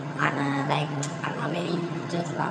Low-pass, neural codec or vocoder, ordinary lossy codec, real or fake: none; vocoder, 22.05 kHz, 80 mel bands, HiFi-GAN; none; fake